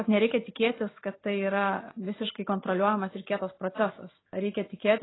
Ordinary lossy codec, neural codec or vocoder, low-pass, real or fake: AAC, 16 kbps; none; 7.2 kHz; real